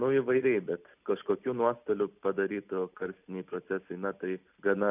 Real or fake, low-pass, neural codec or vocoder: real; 3.6 kHz; none